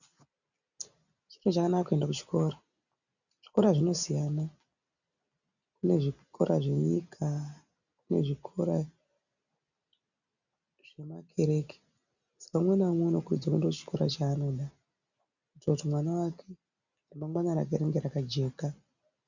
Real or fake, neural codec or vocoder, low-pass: real; none; 7.2 kHz